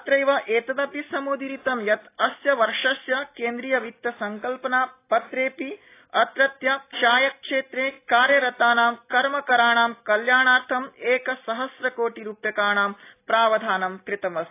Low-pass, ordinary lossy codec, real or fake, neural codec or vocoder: 3.6 kHz; AAC, 24 kbps; real; none